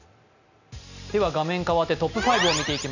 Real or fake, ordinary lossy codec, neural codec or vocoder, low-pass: real; none; none; 7.2 kHz